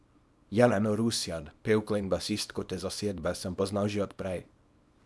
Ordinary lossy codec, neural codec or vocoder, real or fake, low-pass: none; codec, 24 kHz, 0.9 kbps, WavTokenizer, small release; fake; none